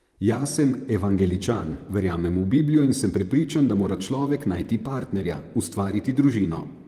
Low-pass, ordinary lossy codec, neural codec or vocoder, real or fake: 14.4 kHz; Opus, 32 kbps; vocoder, 44.1 kHz, 128 mel bands, Pupu-Vocoder; fake